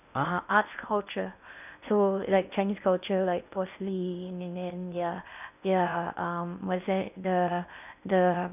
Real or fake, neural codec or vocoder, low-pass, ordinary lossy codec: fake; codec, 16 kHz in and 24 kHz out, 0.6 kbps, FocalCodec, streaming, 2048 codes; 3.6 kHz; none